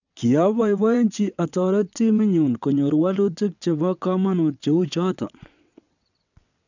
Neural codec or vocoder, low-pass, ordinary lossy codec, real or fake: vocoder, 22.05 kHz, 80 mel bands, WaveNeXt; 7.2 kHz; none; fake